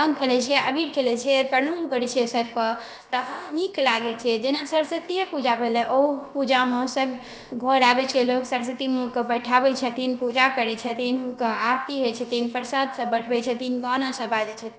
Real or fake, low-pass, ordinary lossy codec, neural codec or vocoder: fake; none; none; codec, 16 kHz, about 1 kbps, DyCAST, with the encoder's durations